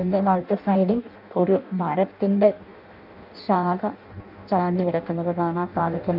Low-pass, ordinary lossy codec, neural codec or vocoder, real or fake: 5.4 kHz; none; codec, 16 kHz in and 24 kHz out, 0.6 kbps, FireRedTTS-2 codec; fake